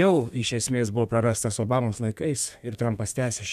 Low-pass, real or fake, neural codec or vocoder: 14.4 kHz; fake; codec, 32 kHz, 1.9 kbps, SNAC